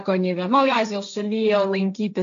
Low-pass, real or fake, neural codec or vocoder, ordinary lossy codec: 7.2 kHz; fake; codec, 16 kHz, 1.1 kbps, Voila-Tokenizer; MP3, 64 kbps